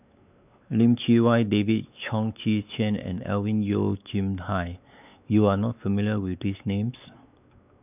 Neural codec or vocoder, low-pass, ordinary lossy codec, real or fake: codec, 16 kHz, 4 kbps, X-Codec, WavLM features, trained on Multilingual LibriSpeech; 3.6 kHz; none; fake